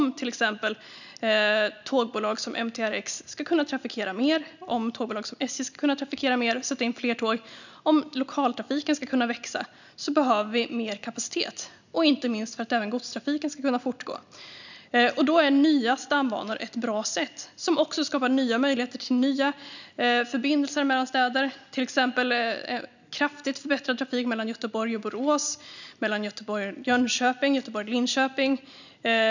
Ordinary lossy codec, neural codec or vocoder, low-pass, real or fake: none; none; 7.2 kHz; real